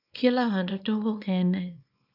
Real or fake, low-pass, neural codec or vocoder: fake; 5.4 kHz; codec, 24 kHz, 0.9 kbps, WavTokenizer, small release